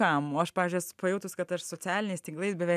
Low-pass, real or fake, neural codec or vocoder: 14.4 kHz; real; none